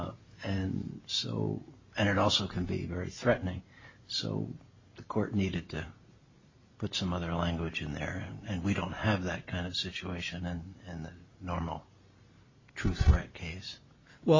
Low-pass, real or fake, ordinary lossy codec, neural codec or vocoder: 7.2 kHz; real; MP3, 32 kbps; none